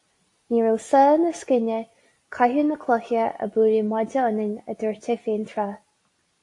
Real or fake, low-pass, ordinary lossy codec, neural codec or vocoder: real; 10.8 kHz; AAC, 48 kbps; none